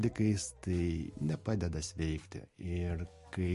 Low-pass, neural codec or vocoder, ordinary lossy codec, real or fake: 14.4 kHz; none; MP3, 48 kbps; real